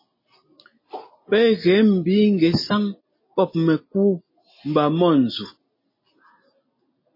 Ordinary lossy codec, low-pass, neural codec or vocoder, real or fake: MP3, 24 kbps; 5.4 kHz; none; real